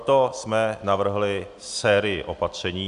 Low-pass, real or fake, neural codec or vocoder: 10.8 kHz; real; none